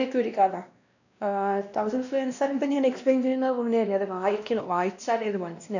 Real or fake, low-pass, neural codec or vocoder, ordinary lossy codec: fake; 7.2 kHz; codec, 16 kHz, 1 kbps, X-Codec, WavLM features, trained on Multilingual LibriSpeech; none